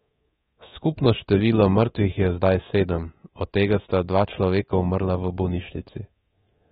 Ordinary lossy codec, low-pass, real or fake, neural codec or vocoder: AAC, 16 kbps; 10.8 kHz; fake; codec, 24 kHz, 3.1 kbps, DualCodec